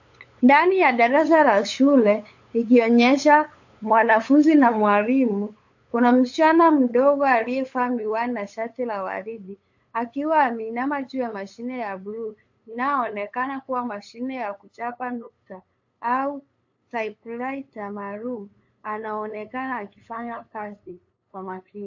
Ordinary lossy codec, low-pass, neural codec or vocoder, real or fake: AAC, 48 kbps; 7.2 kHz; codec, 16 kHz, 8 kbps, FunCodec, trained on LibriTTS, 25 frames a second; fake